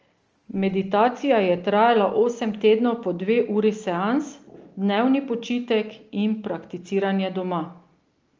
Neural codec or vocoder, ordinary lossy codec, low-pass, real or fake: none; Opus, 24 kbps; 7.2 kHz; real